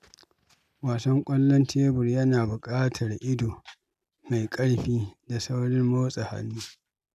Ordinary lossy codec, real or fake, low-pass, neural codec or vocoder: none; real; 14.4 kHz; none